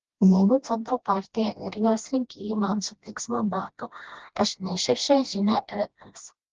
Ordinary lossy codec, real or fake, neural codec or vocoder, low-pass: Opus, 16 kbps; fake; codec, 16 kHz, 1 kbps, FreqCodec, smaller model; 7.2 kHz